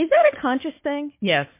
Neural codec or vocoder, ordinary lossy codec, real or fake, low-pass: codec, 16 kHz, 4 kbps, FunCodec, trained on Chinese and English, 50 frames a second; MP3, 24 kbps; fake; 3.6 kHz